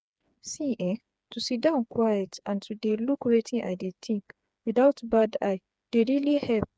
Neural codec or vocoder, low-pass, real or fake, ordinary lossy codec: codec, 16 kHz, 4 kbps, FreqCodec, smaller model; none; fake; none